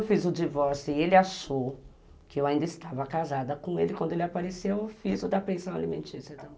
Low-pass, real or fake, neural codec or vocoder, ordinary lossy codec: none; real; none; none